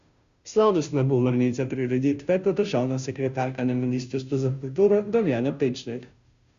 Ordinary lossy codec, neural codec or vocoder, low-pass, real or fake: Opus, 64 kbps; codec, 16 kHz, 0.5 kbps, FunCodec, trained on Chinese and English, 25 frames a second; 7.2 kHz; fake